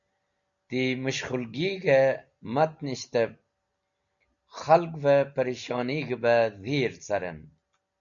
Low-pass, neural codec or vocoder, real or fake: 7.2 kHz; none; real